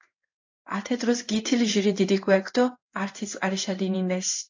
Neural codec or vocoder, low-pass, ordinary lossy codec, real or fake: codec, 16 kHz in and 24 kHz out, 1 kbps, XY-Tokenizer; 7.2 kHz; AAC, 48 kbps; fake